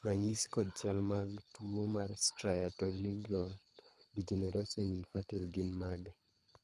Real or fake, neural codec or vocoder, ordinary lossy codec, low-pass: fake; codec, 24 kHz, 3 kbps, HILCodec; none; none